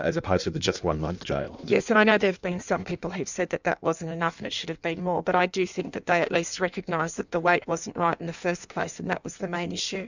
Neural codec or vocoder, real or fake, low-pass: codec, 16 kHz in and 24 kHz out, 1.1 kbps, FireRedTTS-2 codec; fake; 7.2 kHz